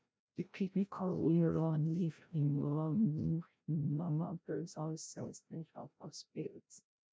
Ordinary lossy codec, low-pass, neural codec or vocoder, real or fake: none; none; codec, 16 kHz, 0.5 kbps, FreqCodec, larger model; fake